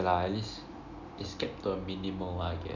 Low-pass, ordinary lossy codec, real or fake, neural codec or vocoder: 7.2 kHz; none; real; none